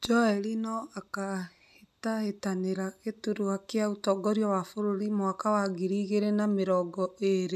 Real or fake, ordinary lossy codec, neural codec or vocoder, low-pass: real; none; none; 14.4 kHz